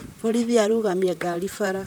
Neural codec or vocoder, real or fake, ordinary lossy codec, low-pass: vocoder, 44.1 kHz, 128 mel bands, Pupu-Vocoder; fake; none; none